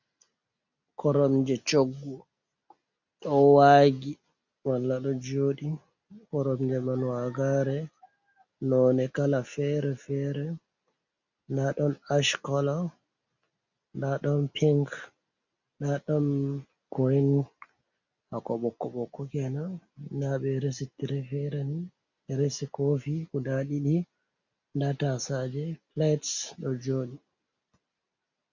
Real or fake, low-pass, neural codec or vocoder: real; 7.2 kHz; none